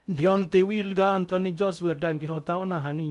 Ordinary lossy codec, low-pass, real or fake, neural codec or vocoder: none; 10.8 kHz; fake; codec, 16 kHz in and 24 kHz out, 0.6 kbps, FocalCodec, streaming, 2048 codes